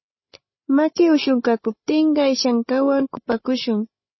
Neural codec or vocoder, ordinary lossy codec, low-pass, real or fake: codec, 16 kHz, 16 kbps, FreqCodec, larger model; MP3, 24 kbps; 7.2 kHz; fake